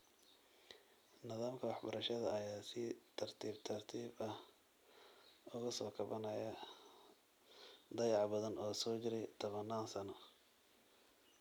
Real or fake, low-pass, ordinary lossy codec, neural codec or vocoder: real; none; none; none